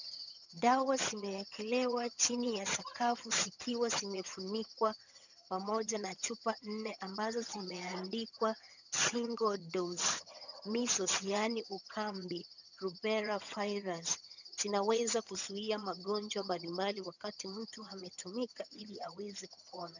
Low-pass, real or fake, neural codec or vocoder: 7.2 kHz; fake; vocoder, 22.05 kHz, 80 mel bands, HiFi-GAN